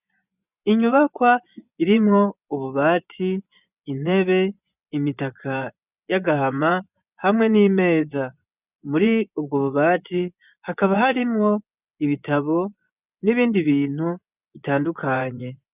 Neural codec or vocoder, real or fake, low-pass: vocoder, 24 kHz, 100 mel bands, Vocos; fake; 3.6 kHz